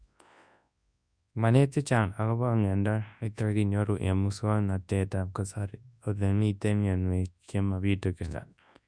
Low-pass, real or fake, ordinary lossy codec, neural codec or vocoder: 9.9 kHz; fake; none; codec, 24 kHz, 0.9 kbps, WavTokenizer, large speech release